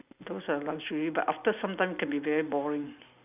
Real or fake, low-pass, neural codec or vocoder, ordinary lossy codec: real; 3.6 kHz; none; none